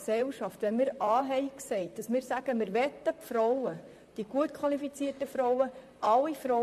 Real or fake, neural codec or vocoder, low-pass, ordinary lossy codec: fake; vocoder, 44.1 kHz, 128 mel bands, Pupu-Vocoder; 14.4 kHz; MP3, 64 kbps